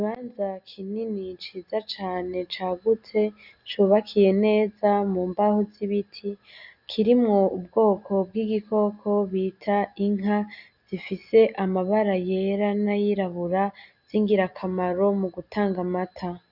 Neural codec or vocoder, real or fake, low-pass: none; real; 5.4 kHz